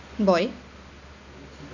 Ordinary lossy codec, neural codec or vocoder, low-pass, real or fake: none; none; 7.2 kHz; real